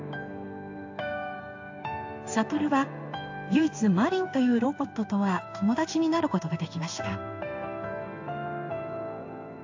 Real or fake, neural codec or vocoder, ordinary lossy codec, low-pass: fake; codec, 16 kHz in and 24 kHz out, 1 kbps, XY-Tokenizer; AAC, 48 kbps; 7.2 kHz